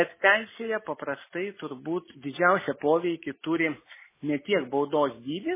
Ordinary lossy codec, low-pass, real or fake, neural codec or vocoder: MP3, 16 kbps; 3.6 kHz; real; none